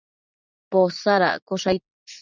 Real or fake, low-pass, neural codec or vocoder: fake; 7.2 kHz; vocoder, 44.1 kHz, 80 mel bands, Vocos